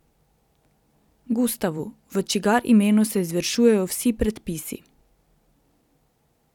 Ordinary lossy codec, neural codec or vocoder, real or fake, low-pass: none; vocoder, 44.1 kHz, 128 mel bands every 256 samples, BigVGAN v2; fake; 19.8 kHz